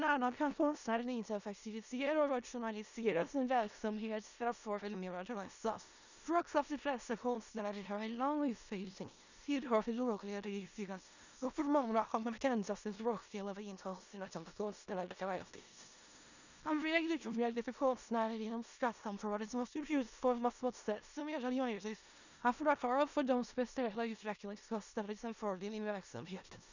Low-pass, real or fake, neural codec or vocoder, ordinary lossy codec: 7.2 kHz; fake; codec, 16 kHz in and 24 kHz out, 0.4 kbps, LongCat-Audio-Codec, four codebook decoder; none